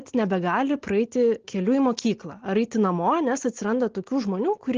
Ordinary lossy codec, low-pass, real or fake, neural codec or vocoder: Opus, 16 kbps; 7.2 kHz; real; none